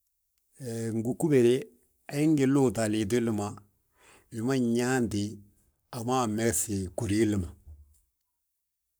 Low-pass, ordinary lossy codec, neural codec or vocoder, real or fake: none; none; codec, 44.1 kHz, 7.8 kbps, Pupu-Codec; fake